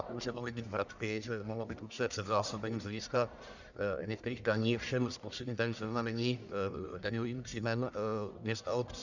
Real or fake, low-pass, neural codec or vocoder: fake; 7.2 kHz; codec, 44.1 kHz, 1.7 kbps, Pupu-Codec